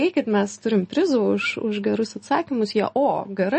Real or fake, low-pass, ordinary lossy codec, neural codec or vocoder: real; 9.9 kHz; MP3, 32 kbps; none